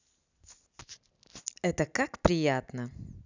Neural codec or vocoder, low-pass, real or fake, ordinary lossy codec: none; 7.2 kHz; real; none